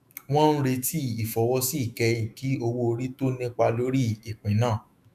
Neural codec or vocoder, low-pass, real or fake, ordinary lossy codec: autoencoder, 48 kHz, 128 numbers a frame, DAC-VAE, trained on Japanese speech; 14.4 kHz; fake; none